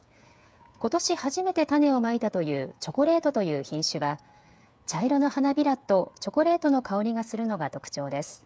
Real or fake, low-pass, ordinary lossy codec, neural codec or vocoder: fake; none; none; codec, 16 kHz, 8 kbps, FreqCodec, smaller model